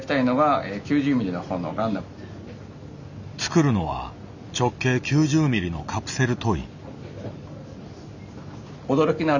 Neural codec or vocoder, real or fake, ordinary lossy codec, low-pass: none; real; none; 7.2 kHz